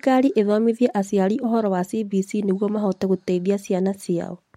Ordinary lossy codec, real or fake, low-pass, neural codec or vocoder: MP3, 64 kbps; real; 19.8 kHz; none